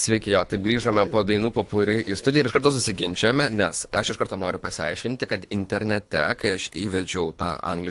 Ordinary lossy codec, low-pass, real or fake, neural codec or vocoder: AAC, 64 kbps; 10.8 kHz; fake; codec, 24 kHz, 3 kbps, HILCodec